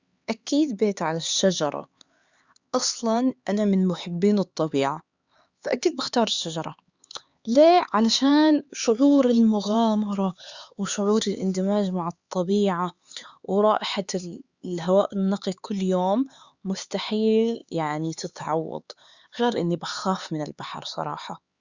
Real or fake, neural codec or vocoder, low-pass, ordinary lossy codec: fake; codec, 16 kHz, 4 kbps, X-Codec, HuBERT features, trained on LibriSpeech; 7.2 kHz; Opus, 64 kbps